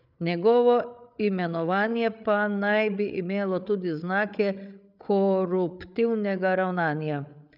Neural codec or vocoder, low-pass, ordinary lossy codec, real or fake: codec, 16 kHz, 8 kbps, FreqCodec, larger model; 5.4 kHz; none; fake